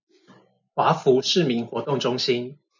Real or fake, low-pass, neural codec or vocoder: real; 7.2 kHz; none